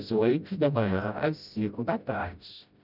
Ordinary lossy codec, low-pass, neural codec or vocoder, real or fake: none; 5.4 kHz; codec, 16 kHz, 0.5 kbps, FreqCodec, smaller model; fake